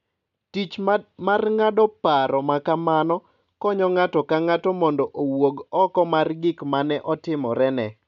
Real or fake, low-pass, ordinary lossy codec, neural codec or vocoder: real; 7.2 kHz; none; none